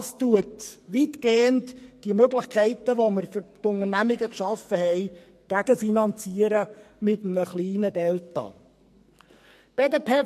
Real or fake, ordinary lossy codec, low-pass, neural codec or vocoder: fake; MP3, 64 kbps; 14.4 kHz; codec, 44.1 kHz, 2.6 kbps, SNAC